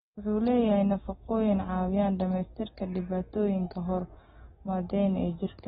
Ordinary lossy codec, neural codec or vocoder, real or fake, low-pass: AAC, 16 kbps; none; real; 7.2 kHz